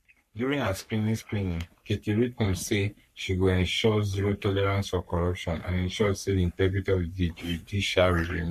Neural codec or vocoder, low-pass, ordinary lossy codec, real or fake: codec, 44.1 kHz, 3.4 kbps, Pupu-Codec; 14.4 kHz; MP3, 64 kbps; fake